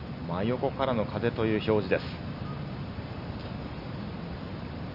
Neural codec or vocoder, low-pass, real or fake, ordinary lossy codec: none; 5.4 kHz; real; none